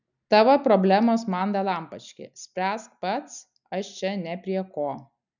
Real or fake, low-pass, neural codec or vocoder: real; 7.2 kHz; none